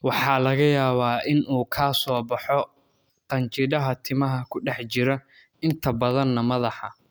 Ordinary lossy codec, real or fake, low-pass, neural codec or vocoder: none; real; none; none